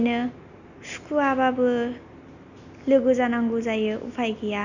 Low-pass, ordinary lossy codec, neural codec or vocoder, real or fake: 7.2 kHz; none; none; real